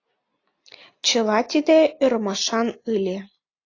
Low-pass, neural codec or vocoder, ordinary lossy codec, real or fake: 7.2 kHz; none; AAC, 32 kbps; real